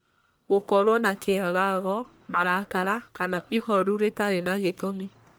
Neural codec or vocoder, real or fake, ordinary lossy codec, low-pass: codec, 44.1 kHz, 1.7 kbps, Pupu-Codec; fake; none; none